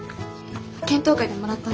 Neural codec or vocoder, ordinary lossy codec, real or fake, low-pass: none; none; real; none